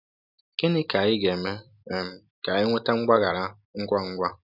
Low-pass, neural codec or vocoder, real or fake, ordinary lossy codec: 5.4 kHz; none; real; none